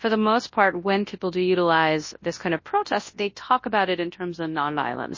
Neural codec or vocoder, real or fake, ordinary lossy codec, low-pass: codec, 24 kHz, 0.9 kbps, WavTokenizer, large speech release; fake; MP3, 32 kbps; 7.2 kHz